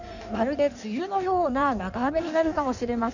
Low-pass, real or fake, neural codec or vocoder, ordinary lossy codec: 7.2 kHz; fake; codec, 16 kHz in and 24 kHz out, 1.1 kbps, FireRedTTS-2 codec; none